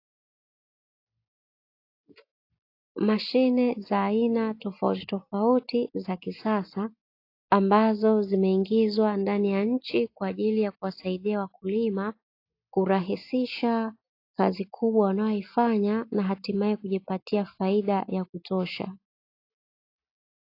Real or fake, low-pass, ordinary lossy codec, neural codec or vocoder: real; 5.4 kHz; AAC, 32 kbps; none